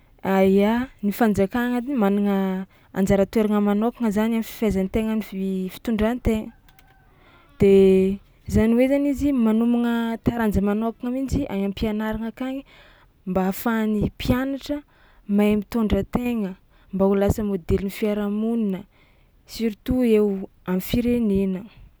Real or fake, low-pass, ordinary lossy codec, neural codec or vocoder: real; none; none; none